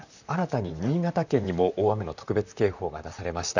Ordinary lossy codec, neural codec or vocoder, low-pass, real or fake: none; vocoder, 44.1 kHz, 128 mel bands, Pupu-Vocoder; 7.2 kHz; fake